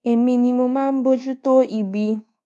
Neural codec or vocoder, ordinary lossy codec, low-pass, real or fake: codec, 24 kHz, 1.2 kbps, DualCodec; none; none; fake